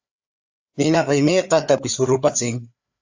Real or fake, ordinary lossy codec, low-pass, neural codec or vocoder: fake; AAC, 48 kbps; 7.2 kHz; codec, 16 kHz, 4 kbps, FreqCodec, larger model